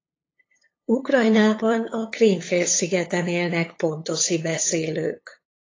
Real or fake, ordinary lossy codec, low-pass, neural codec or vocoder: fake; AAC, 32 kbps; 7.2 kHz; codec, 16 kHz, 8 kbps, FunCodec, trained on LibriTTS, 25 frames a second